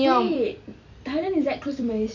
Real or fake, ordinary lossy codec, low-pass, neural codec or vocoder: fake; none; 7.2 kHz; vocoder, 44.1 kHz, 128 mel bands every 256 samples, BigVGAN v2